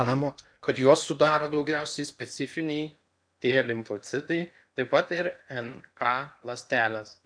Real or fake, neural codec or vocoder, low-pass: fake; codec, 16 kHz in and 24 kHz out, 0.8 kbps, FocalCodec, streaming, 65536 codes; 9.9 kHz